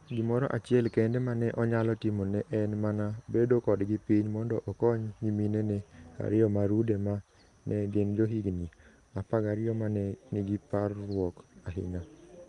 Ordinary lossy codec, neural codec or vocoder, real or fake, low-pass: Opus, 32 kbps; none; real; 10.8 kHz